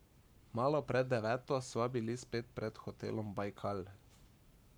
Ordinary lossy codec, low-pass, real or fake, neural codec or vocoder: none; none; fake; codec, 44.1 kHz, 7.8 kbps, Pupu-Codec